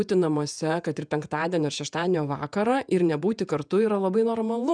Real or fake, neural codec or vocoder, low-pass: fake; vocoder, 48 kHz, 128 mel bands, Vocos; 9.9 kHz